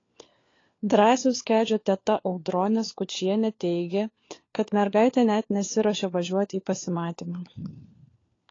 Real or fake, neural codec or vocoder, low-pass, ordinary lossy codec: fake; codec, 16 kHz, 4 kbps, FunCodec, trained on LibriTTS, 50 frames a second; 7.2 kHz; AAC, 32 kbps